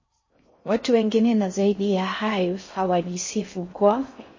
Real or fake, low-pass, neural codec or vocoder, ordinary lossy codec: fake; 7.2 kHz; codec, 16 kHz in and 24 kHz out, 0.6 kbps, FocalCodec, streaming, 4096 codes; MP3, 32 kbps